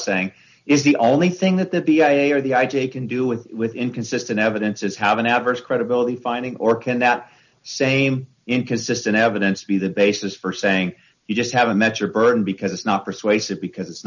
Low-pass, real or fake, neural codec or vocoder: 7.2 kHz; real; none